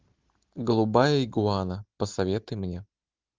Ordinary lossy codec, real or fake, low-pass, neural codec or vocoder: Opus, 32 kbps; real; 7.2 kHz; none